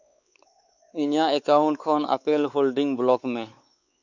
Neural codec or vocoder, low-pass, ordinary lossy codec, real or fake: codec, 16 kHz, 4 kbps, X-Codec, WavLM features, trained on Multilingual LibriSpeech; 7.2 kHz; none; fake